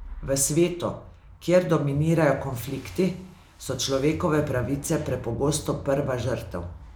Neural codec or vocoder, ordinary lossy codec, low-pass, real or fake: none; none; none; real